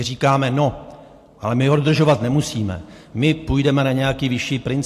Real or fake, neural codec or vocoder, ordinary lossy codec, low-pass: real; none; AAC, 64 kbps; 14.4 kHz